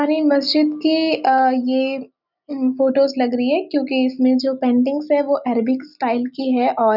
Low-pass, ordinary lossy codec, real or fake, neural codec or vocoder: 5.4 kHz; none; real; none